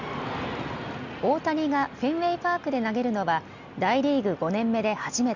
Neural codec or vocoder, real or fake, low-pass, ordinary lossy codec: none; real; 7.2 kHz; Opus, 64 kbps